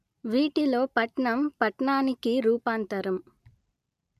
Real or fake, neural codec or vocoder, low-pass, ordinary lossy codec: real; none; 14.4 kHz; none